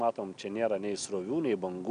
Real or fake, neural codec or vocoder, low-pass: real; none; 9.9 kHz